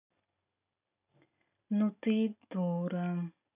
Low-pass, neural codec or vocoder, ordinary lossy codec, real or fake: 3.6 kHz; none; none; real